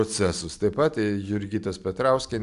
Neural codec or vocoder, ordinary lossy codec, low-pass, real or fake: none; AAC, 96 kbps; 10.8 kHz; real